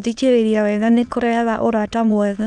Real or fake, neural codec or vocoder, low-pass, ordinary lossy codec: fake; autoencoder, 22.05 kHz, a latent of 192 numbers a frame, VITS, trained on many speakers; 9.9 kHz; none